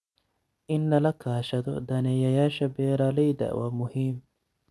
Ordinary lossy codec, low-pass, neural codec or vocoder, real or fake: none; none; none; real